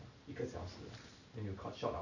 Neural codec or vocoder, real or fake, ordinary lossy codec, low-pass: none; real; none; 7.2 kHz